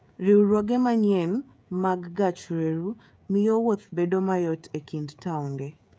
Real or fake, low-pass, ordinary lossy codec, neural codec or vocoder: fake; none; none; codec, 16 kHz, 16 kbps, FreqCodec, smaller model